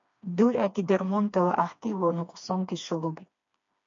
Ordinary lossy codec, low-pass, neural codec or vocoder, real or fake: MP3, 64 kbps; 7.2 kHz; codec, 16 kHz, 2 kbps, FreqCodec, smaller model; fake